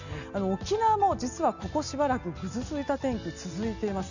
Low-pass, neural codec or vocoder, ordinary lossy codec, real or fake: 7.2 kHz; none; none; real